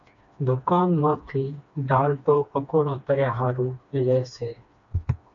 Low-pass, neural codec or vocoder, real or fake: 7.2 kHz; codec, 16 kHz, 2 kbps, FreqCodec, smaller model; fake